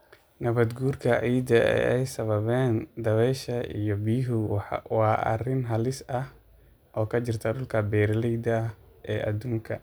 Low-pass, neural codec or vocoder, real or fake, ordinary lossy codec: none; none; real; none